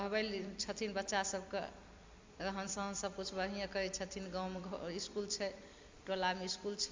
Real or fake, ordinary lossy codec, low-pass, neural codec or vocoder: fake; MP3, 64 kbps; 7.2 kHz; vocoder, 44.1 kHz, 128 mel bands every 256 samples, BigVGAN v2